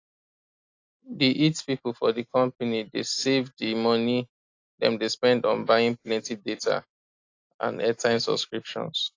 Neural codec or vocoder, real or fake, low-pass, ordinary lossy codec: none; real; 7.2 kHz; AAC, 48 kbps